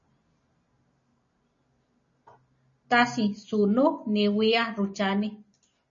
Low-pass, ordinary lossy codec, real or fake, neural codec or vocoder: 7.2 kHz; MP3, 32 kbps; real; none